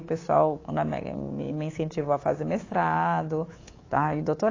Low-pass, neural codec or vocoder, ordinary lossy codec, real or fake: 7.2 kHz; codec, 24 kHz, 3.1 kbps, DualCodec; AAC, 32 kbps; fake